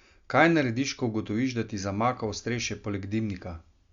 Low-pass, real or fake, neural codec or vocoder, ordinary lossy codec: 7.2 kHz; real; none; Opus, 64 kbps